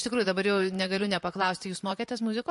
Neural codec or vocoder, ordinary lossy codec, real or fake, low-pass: vocoder, 44.1 kHz, 128 mel bands, Pupu-Vocoder; MP3, 48 kbps; fake; 14.4 kHz